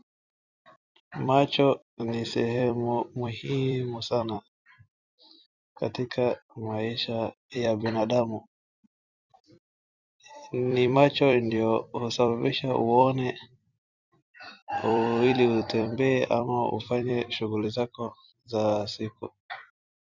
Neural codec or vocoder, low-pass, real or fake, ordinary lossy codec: vocoder, 44.1 kHz, 128 mel bands every 256 samples, BigVGAN v2; 7.2 kHz; fake; Opus, 64 kbps